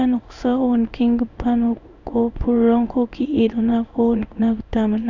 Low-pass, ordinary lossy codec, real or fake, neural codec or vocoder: 7.2 kHz; none; fake; codec, 16 kHz in and 24 kHz out, 1 kbps, XY-Tokenizer